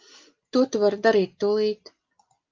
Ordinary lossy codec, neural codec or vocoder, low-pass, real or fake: Opus, 24 kbps; none; 7.2 kHz; real